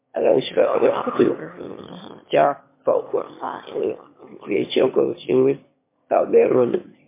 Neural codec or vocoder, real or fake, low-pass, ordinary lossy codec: autoencoder, 22.05 kHz, a latent of 192 numbers a frame, VITS, trained on one speaker; fake; 3.6 kHz; MP3, 24 kbps